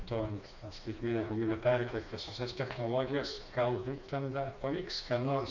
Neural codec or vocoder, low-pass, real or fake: codec, 16 kHz, 2 kbps, FreqCodec, smaller model; 7.2 kHz; fake